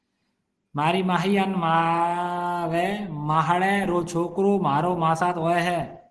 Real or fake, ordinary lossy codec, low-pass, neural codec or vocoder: real; Opus, 16 kbps; 10.8 kHz; none